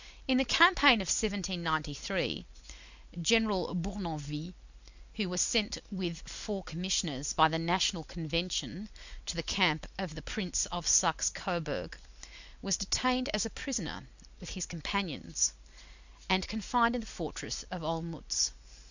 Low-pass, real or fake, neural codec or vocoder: 7.2 kHz; real; none